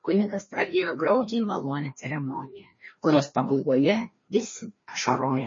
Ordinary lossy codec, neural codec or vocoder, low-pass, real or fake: MP3, 32 kbps; codec, 16 kHz, 1 kbps, FreqCodec, larger model; 7.2 kHz; fake